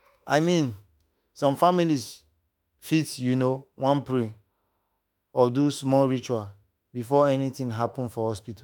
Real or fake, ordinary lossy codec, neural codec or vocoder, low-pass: fake; none; autoencoder, 48 kHz, 32 numbers a frame, DAC-VAE, trained on Japanese speech; none